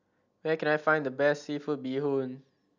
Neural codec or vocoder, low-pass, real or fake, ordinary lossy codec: none; 7.2 kHz; real; none